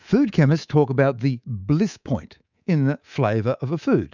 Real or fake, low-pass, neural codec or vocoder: fake; 7.2 kHz; codec, 24 kHz, 3.1 kbps, DualCodec